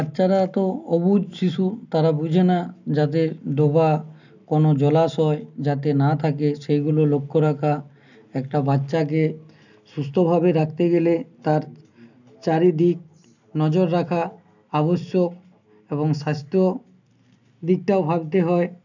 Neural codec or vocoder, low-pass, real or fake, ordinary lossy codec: none; 7.2 kHz; real; none